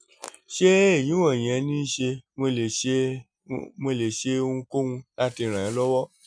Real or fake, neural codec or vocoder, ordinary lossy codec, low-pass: real; none; none; 9.9 kHz